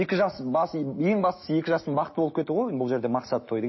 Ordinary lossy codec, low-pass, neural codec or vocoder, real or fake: MP3, 24 kbps; 7.2 kHz; none; real